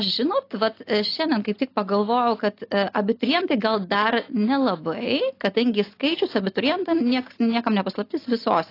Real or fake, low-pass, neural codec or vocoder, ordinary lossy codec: real; 5.4 kHz; none; AAC, 32 kbps